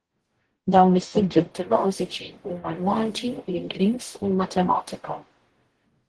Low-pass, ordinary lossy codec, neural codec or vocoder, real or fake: 10.8 kHz; Opus, 16 kbps; codec, 44.1 kHz, 0.9 kbps, DAC; fake